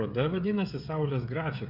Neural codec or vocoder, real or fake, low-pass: codec, 16 kHz, 16 kbps, FreqCodec, smaller model; fake; 5.4 kHz